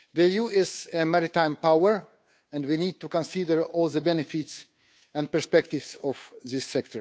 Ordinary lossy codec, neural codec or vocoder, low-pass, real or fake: none; codec, 16 kHz, 2 kbps, FunCodec, trained on Chinese and English, 25 frames a second; none; fake